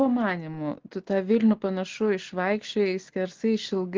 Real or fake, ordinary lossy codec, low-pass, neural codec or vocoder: real; Opus, 16 kbps; 7.2 kHz; none